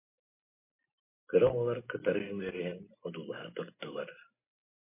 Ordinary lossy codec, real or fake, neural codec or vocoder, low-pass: MP3, 24 kbps; real; none; 3.6 kHz